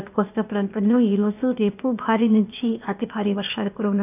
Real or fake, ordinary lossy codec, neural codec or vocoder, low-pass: fake; none; codec, 16 kHz, 0.8 kbps, ZipCodec; 3.6 kHz